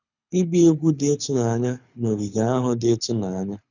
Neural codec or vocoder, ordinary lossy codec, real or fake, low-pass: codec, 24 kHz, 6 kbps, HILCodec; none; fake; 7.2 kHz